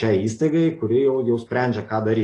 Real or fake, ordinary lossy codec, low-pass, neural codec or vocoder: real; AAC, 32 kbps; 10.8 kHz; none